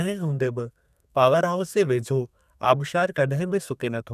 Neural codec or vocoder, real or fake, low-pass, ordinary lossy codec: codec, 32 kHz, 1.9 kbps, SNAC; fake; 14.4 kHz; none